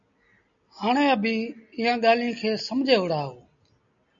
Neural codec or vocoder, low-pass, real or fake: none; 7.2 kHz; real